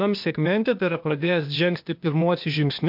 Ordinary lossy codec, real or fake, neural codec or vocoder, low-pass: MP3, 48 kbps; fake; codec, 16 kHz, 0.8 kbps, ZipCodec; 5.4 kHz